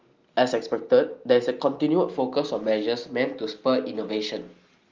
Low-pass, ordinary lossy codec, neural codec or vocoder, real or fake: 7.2 kHz; Opus, 32 kbps; none; real